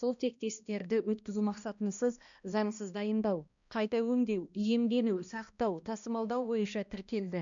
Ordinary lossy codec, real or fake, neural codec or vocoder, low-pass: none; fake; codec, 16 kHz, 1 kbps, X-Codec, HuBERT features, trained on balanced general audio; 7.2 kHz